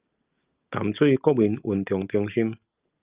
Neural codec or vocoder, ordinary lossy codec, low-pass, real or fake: none; Opus, 24 kbps; 3.6 kHz; real